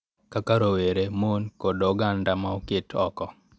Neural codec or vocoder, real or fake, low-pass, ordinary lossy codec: none; real; none; none